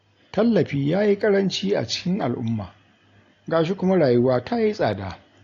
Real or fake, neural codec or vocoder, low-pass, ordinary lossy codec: real; none; 7.2 kHz; AAC, 48 kbps